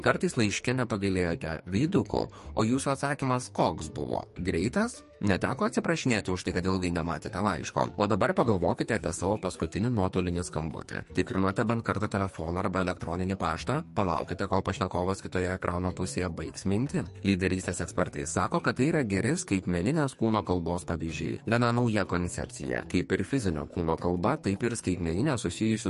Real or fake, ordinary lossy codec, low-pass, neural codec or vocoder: fake; MP3, 48 kbps; 14.4 kHz; codec, 44.1 kHz, 2.6 kbps, SNAC